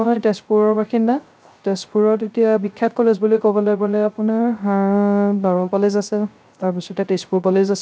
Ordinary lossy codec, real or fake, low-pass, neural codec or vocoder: none; fake; none; codec, 16 kHz, 0.3 kbps, FocalCodec